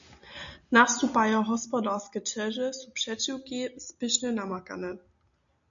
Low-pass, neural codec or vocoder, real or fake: 7.2 kHz; none; real